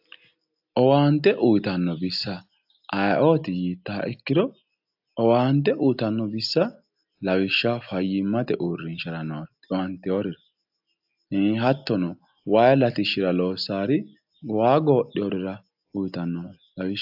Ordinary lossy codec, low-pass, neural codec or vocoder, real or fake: AAC, 48 kbps; 5.4 kHz; none; real